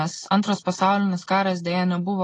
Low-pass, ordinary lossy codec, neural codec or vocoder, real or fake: 10.8 kHz; AAC, 32 kbps; none; real